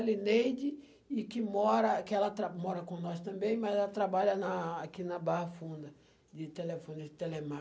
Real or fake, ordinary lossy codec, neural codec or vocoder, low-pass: real; none; none; none